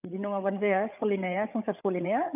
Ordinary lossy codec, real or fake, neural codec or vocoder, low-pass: none; fake; codec, 16 kHz, 8 kbps, FreqCodec, larger model; 3.6 kHz